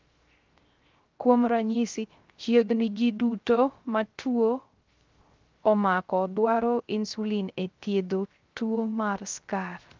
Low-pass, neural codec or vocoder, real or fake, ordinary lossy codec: 7.2 kHz; codec, 16 kHz, 0.3 kbps, FocalCodec; fake; Opus, 32 kbps